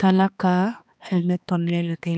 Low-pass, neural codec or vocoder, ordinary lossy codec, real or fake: none; codec, 16 kHz, 2 kbps, X-Codec, HuBERT features, trained on balanced general audio; none; fake